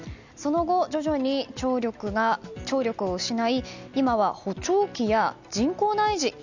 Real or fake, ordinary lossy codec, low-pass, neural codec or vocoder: real; none; 7.2 kHz; none